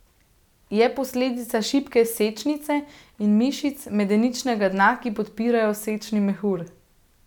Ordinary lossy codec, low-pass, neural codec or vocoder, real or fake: none; 19.8 kHz; none; real